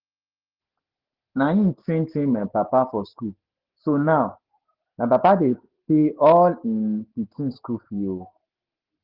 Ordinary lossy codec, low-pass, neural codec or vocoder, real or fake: Opus, 16 kbps; 5.4 kHz; none; real